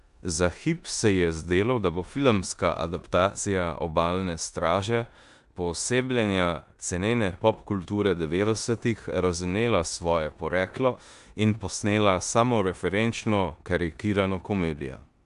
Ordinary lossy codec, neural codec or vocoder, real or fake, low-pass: AAC, 96 kbps; codec, 16 kHz in and 24 kHz out, 0.9 kbps, LongCat-Audio-Codec, four codebook decoder; fake; 10.8 kHz